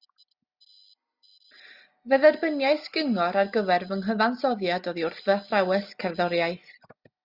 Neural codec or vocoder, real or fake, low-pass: none; real; 5.4 kHz